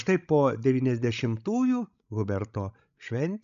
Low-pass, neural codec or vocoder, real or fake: 7.2 kHz; codec, 16 kHz, 16 kbps, FreqCodec, larger model; fake